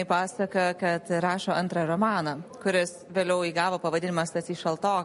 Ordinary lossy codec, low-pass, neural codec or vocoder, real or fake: MP3, 48 kbps; 14.4 kHz; none; real